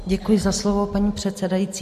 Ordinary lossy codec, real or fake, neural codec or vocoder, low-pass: MP3, 64 kbps; real; none; 14.4 kHz